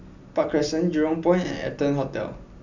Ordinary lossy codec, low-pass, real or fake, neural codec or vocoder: none; 7.2 kHz; real; none